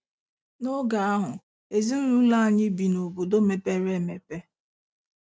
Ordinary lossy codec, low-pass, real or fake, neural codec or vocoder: none; none; real; none